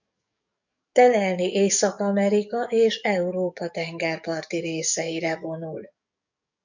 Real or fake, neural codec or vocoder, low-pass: fake; codec, 44.1 kHz, 7.8 kbps, DAC; 7.2 kHz